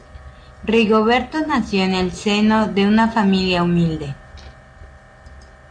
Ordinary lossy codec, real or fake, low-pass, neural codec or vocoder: AAC, 48 kbps; real; 9.9 kHz; none